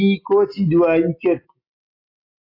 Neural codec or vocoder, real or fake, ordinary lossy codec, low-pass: none; real; AAC, 32 kbps; 5.4 kHz